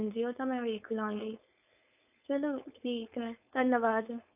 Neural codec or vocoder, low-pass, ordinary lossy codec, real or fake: codec, 16 kHz, 4.8 kbps, FACodec; 3.6 kHz; none; fake